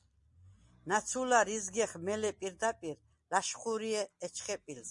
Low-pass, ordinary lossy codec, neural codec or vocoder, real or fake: 10.8 kHz; MP3, 48 kbps; none; real